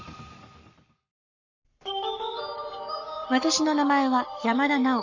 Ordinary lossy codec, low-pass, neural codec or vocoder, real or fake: none; 7.2 kHz; codec, 44.1 kHz, 7.8 kbps, Pupu-Codec; fake